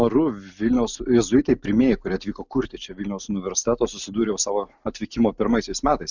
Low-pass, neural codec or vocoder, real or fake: 7.2 kHz; none; real